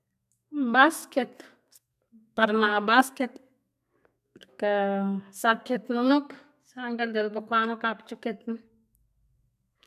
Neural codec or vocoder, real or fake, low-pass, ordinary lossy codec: codec, 32 kHz, 1.9 kbps, SNAC; fake; 14.4 kHz; none